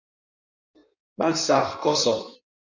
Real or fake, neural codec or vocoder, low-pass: fake; codec, 16 kHz in and 24 kHz out, 1.1 kbps, FireRedTTS-2 codec; 7.2 kHz